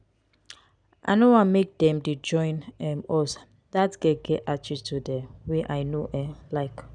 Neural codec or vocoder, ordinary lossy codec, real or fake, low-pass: none; none; real; 9.9 kHz